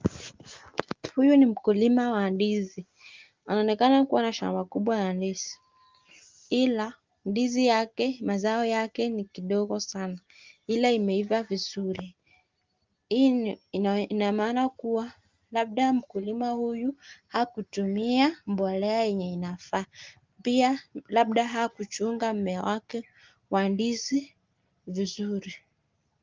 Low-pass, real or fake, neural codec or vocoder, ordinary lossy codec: 7.2 kHz; real; none; Opus, 32 kbps